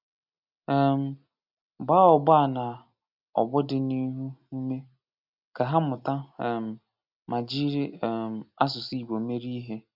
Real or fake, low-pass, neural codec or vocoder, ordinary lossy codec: real; 5.4 kHz; none; none